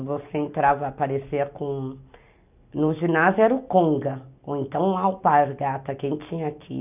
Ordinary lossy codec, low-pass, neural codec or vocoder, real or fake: none; 3.6 kHz; none; real